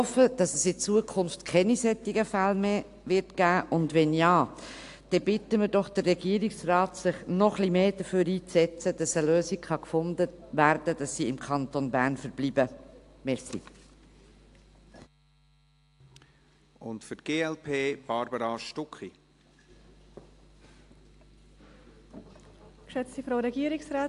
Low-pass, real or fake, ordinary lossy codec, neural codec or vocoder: 10.8 kHz; real; AAC, 64 kbps; none